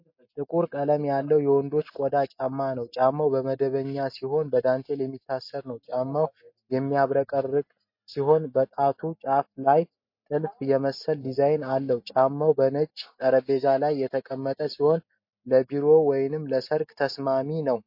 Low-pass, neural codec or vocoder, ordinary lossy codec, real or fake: 5.4 kHz; none; MP3, 32 kbps; real